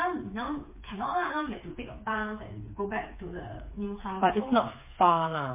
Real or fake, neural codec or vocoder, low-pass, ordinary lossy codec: fake; codec, 16 kHz, 4 kbps, FreqCodec, smaller model; 3.6 kHz; MP3, 24 kbps